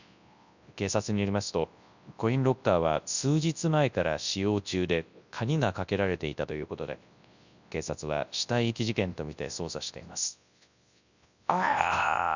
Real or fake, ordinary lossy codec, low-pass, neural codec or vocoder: fake; none; 7.2 kHz; codec, 24 kHz, 0.9 kbps, WavTokenizer, large speech release